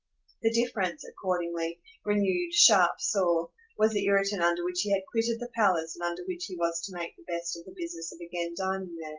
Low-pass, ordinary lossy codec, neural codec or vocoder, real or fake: 7.2 kHz; Opus, 32 kbps; none; real